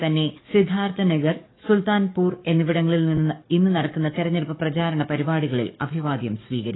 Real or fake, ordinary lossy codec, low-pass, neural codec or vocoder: fake; AAC, 16 kbps; 7.2 kHz; codec, 44.1 kHz, 7.8 kbps, DAC